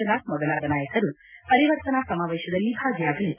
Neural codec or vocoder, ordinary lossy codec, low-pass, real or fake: none; none; 3.6 kHz; real